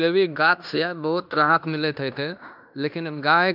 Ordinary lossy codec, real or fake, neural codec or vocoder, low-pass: none; fake; codec, 16 kHz in and 24 kHz out, 0.9 kbps, LongCat-Audio-Codec, fine tuned four codebook decoder; 5.4 kHz